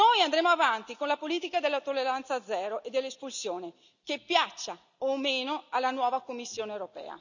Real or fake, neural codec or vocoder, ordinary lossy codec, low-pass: real; none; none; 7.2 kHz